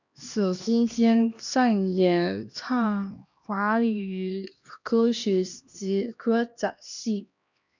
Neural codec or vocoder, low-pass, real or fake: codec, 16 kHz, 1 kbps, X-Codec, HuBERT features, trained on LibriSpeech; 7.2 kHz; fake